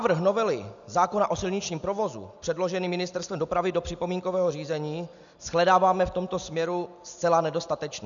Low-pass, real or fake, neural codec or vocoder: 7.2 kHz; real; none